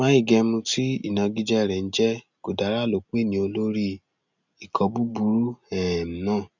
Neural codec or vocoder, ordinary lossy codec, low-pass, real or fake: none; none; 7.2 kHz; real